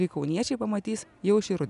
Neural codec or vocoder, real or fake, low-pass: none; real; 10.8 kHz